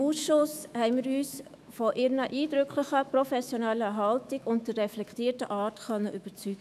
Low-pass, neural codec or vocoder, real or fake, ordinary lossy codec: 14.4 kHz; autoencoder, 48 kHz, 128 numbers a frame, DAC-VAE, trained on Japanese speech; fake; none